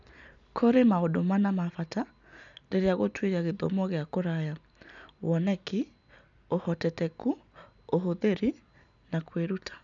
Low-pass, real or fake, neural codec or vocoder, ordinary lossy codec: 7.2 kHz; real; none; none